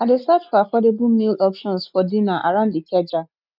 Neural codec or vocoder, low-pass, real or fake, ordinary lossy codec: none; 5.4 kHz; real; none